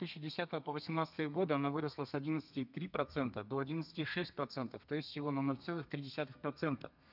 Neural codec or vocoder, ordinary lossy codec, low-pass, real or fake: codec, 32 kHz, 1.9 kbps, SNAC; none; 5.4 kHz; fake